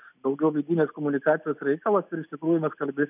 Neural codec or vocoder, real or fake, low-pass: none; real; 3.6 kHz